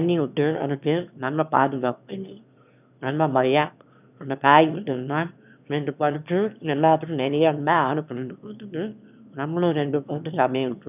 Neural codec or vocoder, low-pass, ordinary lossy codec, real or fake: autoencoder, 22.05 kHz, a latent of 192 numbers a frame, VITS, trained on one speaker; 3.6 kHz; none; fake